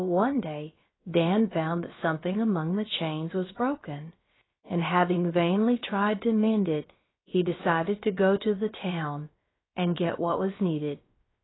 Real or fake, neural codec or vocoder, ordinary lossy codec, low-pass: fake; codec, 16 kHz, about 1 kbps, DyCAST, with the encoder's durations; AAC, 16 kbps; 7.2 kHz